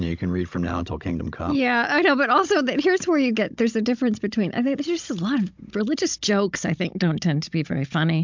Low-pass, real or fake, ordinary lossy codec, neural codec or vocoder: 7.2 kHz; fake; MP3, 64 kbps; codec, 16 kHz, 16 kbps, FunCodec, trained on Chinese and English, 50 frames a second